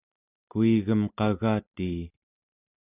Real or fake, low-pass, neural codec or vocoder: real; 3.6 kHz; none